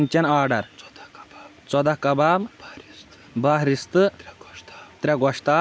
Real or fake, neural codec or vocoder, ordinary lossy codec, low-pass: real; none; none; none